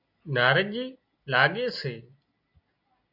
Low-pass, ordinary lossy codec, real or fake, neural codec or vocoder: 5.4 kHz; AAC, 48 kbps; real; none